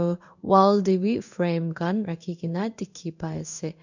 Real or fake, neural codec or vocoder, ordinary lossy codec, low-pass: fake; codec, 16 kHz in and 24 kHz out, 1 kbps, XY-Tokenizer; none; 7.2 kHz